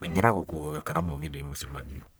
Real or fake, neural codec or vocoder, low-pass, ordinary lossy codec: fake; codec, 44.1 kHz, 1.7 kbps, Pupu-Codec; none; none